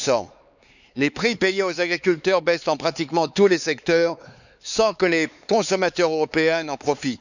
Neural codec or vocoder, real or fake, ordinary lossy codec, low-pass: codec, 16 kHz, 4 kbps, X-Codec, HuBERT features, trained on LibriSpeech; fake; none; 7.2 kHz